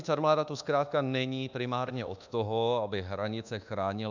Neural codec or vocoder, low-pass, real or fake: codec, 24 kHz, 1.2 kbps, DualCodec; 7.2 kHz; fake